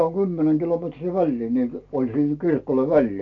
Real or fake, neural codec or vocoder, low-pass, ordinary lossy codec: real; none; 7.2 kHz; AAC, 48 kbps